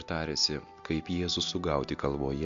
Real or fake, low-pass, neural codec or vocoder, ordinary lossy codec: real; 7.2 kHz; none; MP3, 64 kbps